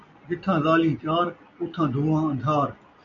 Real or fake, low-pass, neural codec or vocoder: real; 7.2 kHz; none